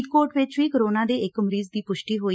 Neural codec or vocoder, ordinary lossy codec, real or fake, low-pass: none; none; real; none